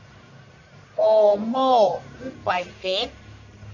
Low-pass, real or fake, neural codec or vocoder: 7.2 kHz; fake; codec, 44.1 kHz, 1.7 kbps, Pupu-Codec